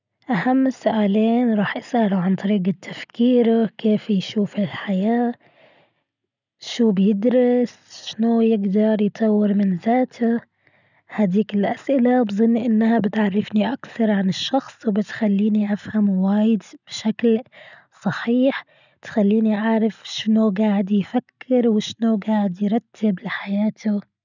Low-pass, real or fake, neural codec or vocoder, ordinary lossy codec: 7.2 kHz; real; none; none